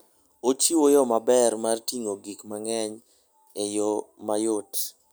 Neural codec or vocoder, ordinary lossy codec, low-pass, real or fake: none; none; none; real